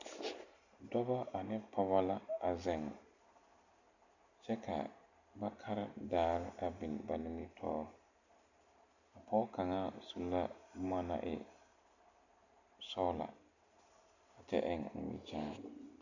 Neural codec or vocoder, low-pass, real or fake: none; 7.2 kHz; real